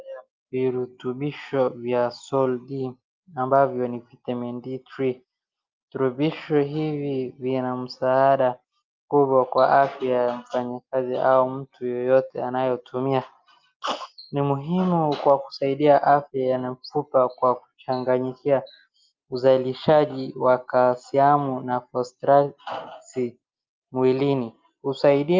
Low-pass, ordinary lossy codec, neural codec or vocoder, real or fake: 7.2 kHz; Opus, 24 kbps; none; real